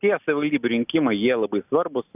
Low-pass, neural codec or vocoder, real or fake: 3.6 kHz; none; real